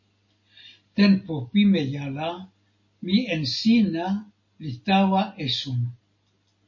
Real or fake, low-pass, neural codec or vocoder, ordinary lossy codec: real; 7.2 kHz; none; MP3, 32 kbps